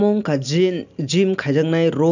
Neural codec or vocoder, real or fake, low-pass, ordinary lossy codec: none; real; 7.2 kHz; none